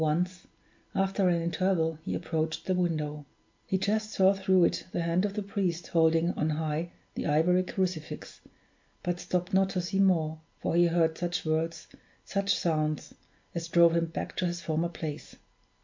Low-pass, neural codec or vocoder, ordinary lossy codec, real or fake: 7.2 kHz; none; MP3, 48 kbps; real